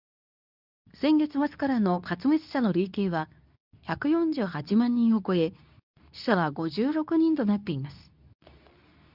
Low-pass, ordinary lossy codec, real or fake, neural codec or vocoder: 5.4 kHz; none; fake; codec, 24 kHz, 0.9 kbps, WavTokenizer, medium speech release version 2